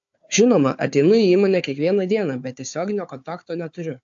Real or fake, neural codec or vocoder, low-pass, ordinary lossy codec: fake; codec, 16 kHz, 4 kbps, FunCodec, trained on Chinese and English, 50 frames a second; 7.2 kHz; AAC, 64 kbps